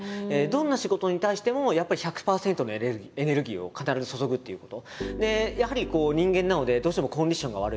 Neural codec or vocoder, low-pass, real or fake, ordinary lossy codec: none; none; real; none